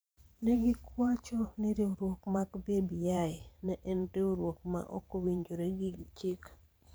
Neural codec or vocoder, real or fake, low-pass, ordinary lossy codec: vocoder, 44.1 kHz, 128 mel bands every 512 samples, BigVGAN v2; fake; none; none